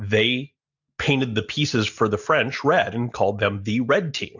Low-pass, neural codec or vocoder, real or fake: 7.2 kHz; none; real